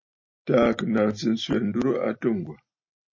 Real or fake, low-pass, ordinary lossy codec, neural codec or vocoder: real; 7.2 kHz; MP3, 32 kbps; none